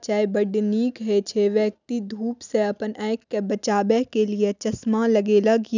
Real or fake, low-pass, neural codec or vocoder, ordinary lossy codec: real; 7.2 kHz; none; none